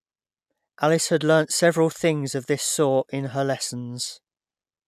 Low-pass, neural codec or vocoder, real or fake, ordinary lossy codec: 14.4 kHz; none; real; none